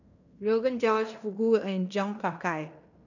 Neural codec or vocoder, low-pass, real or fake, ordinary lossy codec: codec, 16 kHz in and 24 kHz out, 0.9 kbps, LongCat-Audio-Codec, fine tuned four codebook decoder; 7.2 kHz; fake; none